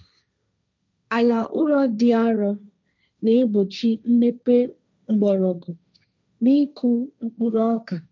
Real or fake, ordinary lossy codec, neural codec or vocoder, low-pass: fake; none; codec, 16 kHz, 1.1 kbps, Voila-Tokenizer; none